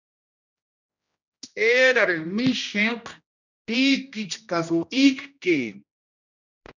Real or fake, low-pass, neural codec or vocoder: fake; 7.2 kHz; codec, 16 kHz, 1 kbps, X-Codec, HuBERT features, trained on general audio